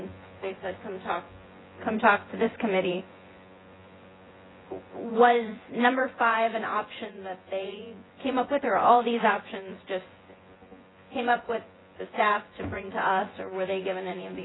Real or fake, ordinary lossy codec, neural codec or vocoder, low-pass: fake; AAC, 16 kbps; vocoder, 24 kHz, 100 mel bands, Vocos; 7.2 kHz